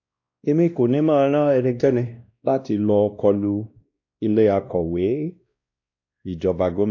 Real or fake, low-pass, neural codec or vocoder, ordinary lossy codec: fake; 7.2 kHz; codec, 16 kHz, 1 kbps, X-Codec, WavLM features, trained on Multilingual LibriSpeech; AAC, 48 kbps